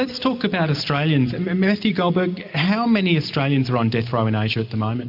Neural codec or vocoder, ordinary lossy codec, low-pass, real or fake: none; MP3, 48 kbps; 5.4 kHz; real